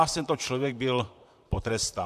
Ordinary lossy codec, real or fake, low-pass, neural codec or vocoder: MP3, 96 kbps; fake; 14.4 kHz; vocoder, 44.1 kHz, 128 mel bands, Pupu-Vocoder